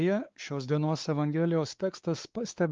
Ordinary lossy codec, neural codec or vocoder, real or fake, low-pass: Opus, 32 kbps; codec, 16 kHz, 4 kbps, X-Codec, WavLM features, trained on Multilingual LibriSpeech; fake; 7.2 kHz